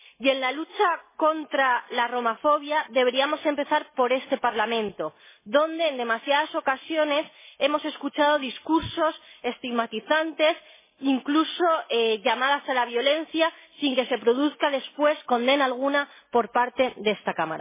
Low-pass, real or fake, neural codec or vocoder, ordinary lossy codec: 3.6 kHz; real; none; MP3, 16 kbps